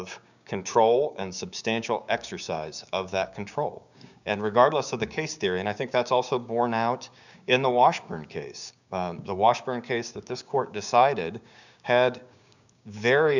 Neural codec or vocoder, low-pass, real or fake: codec, 16 kHz, 6 kbps, DAC; 7.2 kHz; fake